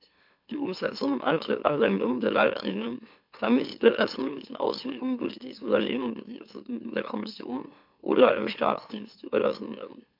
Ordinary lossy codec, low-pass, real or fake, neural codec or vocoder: MP3, 48 kbps; 5.4 kHz; fake; autoencoder, 44.1 kHz, a latent of 192 numbers a frame, MeloTTS